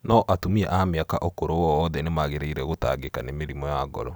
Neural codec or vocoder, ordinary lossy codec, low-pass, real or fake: none; none; none; real